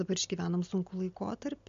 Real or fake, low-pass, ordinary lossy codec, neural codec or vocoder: real; 7.2 kHz; MP3, 48 kbps; none